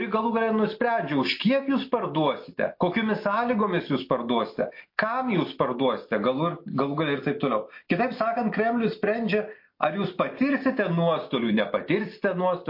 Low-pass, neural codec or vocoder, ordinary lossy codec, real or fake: 5.4 kHz; none; MP3, 32 kbps; real